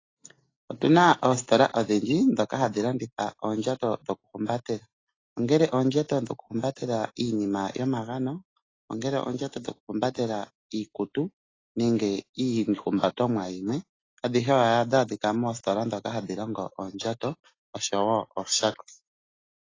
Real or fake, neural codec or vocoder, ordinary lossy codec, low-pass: real; none; AAC, 32 kbps; 7.2 kHz